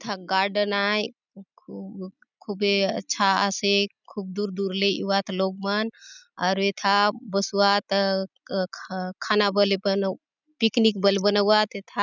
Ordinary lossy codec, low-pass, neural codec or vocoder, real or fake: none; 7.2 kHz; none; real